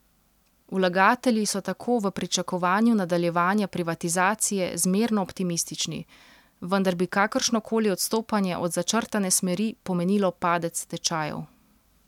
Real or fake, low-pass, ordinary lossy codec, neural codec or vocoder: real; 19.8 kHz; none; none